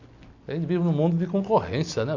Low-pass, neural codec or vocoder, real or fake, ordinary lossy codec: 7.2 kHz; none; real; none